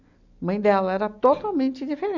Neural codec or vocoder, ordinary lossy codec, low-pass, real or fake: none; none; 7.2 kHz; real